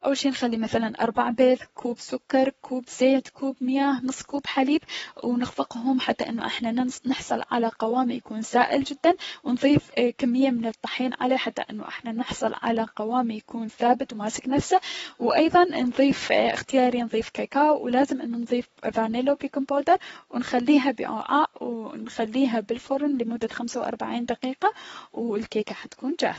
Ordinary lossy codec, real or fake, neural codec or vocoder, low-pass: AAC, 24 kbps; fake; codec, 44.1 kHz, 7.8 kbps, Pupu-Codec; 19.8 kHz